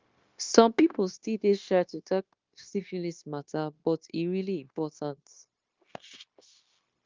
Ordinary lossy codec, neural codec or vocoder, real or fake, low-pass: Opus, 32 kbps; codec, 16 kHz, 0.9 kbps, LongCat-Audio-Codec; fake; 7.2 kHz